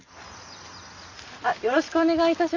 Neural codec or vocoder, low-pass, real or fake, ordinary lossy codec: none; 7.2 kHz; real; none